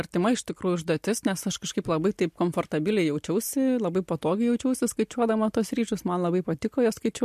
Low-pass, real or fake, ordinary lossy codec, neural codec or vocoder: 14.4 kHz; real; MP3, 64 kbps; none